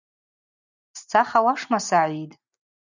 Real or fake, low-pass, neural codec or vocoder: real; 7.2 kHz; none